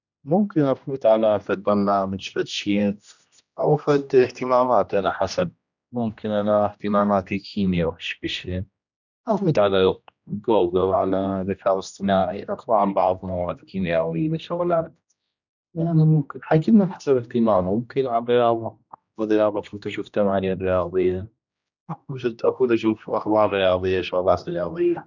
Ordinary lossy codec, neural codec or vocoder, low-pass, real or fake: none; codec, 16 kHz, 1 kbps, X-Codec, HuBERT features, trained on general audio; 7.2 kHz; fake